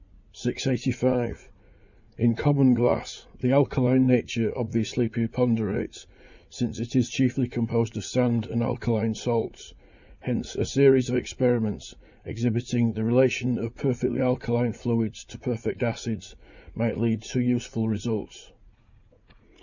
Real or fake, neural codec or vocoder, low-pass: fake; vocoder, 44.1 kHz, 80 mel bands, Vocos; 7.2 kHz